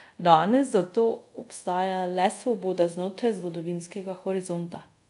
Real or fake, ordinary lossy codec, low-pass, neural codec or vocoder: fake; none; 10.8 kHz; codec, 24 kHz, 0.5 kbps, DualCodec